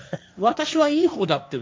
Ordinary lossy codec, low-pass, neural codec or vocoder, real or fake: none; none; codec, 16 kHz, 1.1 kbps, Voila-Tokenizer; fake